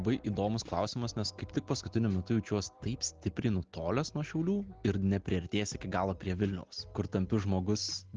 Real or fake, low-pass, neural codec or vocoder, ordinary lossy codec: real; 7.2 kHz; none; Opus, 16 kbps